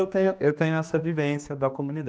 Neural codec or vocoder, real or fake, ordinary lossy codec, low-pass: codec, 16 kHz, 2 kbps, X-Codec, HuBERT features, trained on general audio; fake; none; none